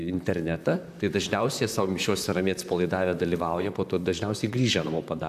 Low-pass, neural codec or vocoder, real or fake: 14.4 kHz; vocoder, 44.1 kHz, 128 mel bands, Pupu-Vocoder; fake